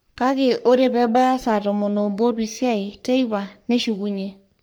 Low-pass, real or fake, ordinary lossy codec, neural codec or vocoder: none; fake; none; codec, 44.1 kHz, 3.4 kbps, Pupu-Codec